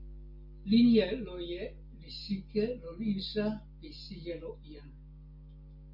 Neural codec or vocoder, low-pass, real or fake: none; 5.4 kHz; real